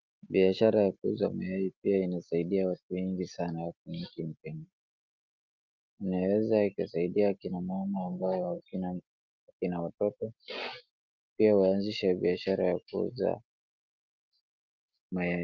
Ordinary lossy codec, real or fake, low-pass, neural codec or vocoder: Opus, 24 kbps; real; 7.2 kHz; none